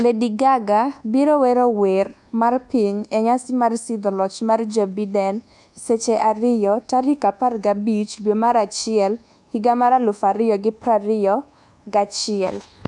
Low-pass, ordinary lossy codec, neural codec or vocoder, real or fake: 10.8 kHz; none; codec, 24 kHz, 1.2 kbps, DualCodec; fake